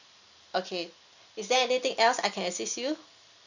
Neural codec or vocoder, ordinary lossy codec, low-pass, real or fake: none; none; 7.2 kHz; real